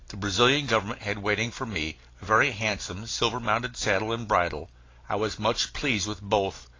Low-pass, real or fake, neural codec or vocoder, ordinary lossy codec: 7.2 kHz; real; none; AAC, 32 kbps